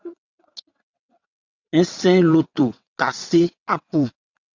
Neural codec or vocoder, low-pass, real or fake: vocoder, 22.05 kHz, 80 mel bands, WaveNeXt; 7.2 kHz; fake